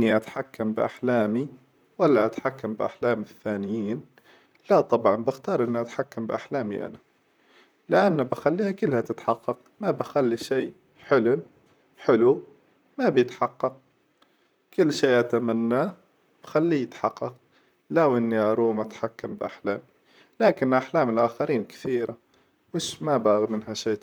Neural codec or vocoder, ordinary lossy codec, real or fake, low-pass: vocoder, 44.1 kHz, 128 mel bands, Pupu-Vocoder; none; fake; none